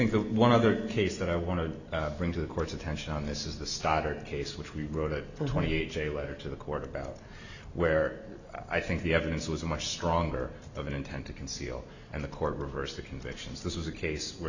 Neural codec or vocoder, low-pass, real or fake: none; 7.2 kHz; real